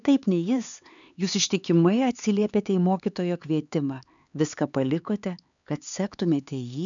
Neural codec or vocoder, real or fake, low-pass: codec, 16 kHz, 4 kbps, X-Codec, HuBERT features, trained on LibriSpeech; fake; 7.2 kHz